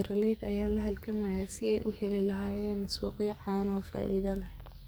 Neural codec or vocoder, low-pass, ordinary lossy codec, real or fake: codec, 44.1 kHz, 2.6 kbps, SNAC; none; none; fake